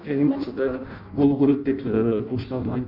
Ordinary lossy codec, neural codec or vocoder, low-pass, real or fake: none; codec, 16 kHz in and 24 kHz out, 0.6 kbps, FireRedTTS-2 codec; 5.4 kHz; fake